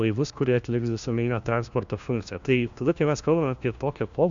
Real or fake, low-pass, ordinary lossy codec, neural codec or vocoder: fake; 7.2 kHz; Opus, 64 kbps; codec, 16 kHz, 1 kbps, FunCodec, trained on LibriTTS, 50 frames a second